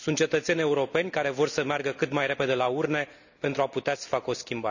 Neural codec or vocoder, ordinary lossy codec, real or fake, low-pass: none; none; real; 7.2 kHz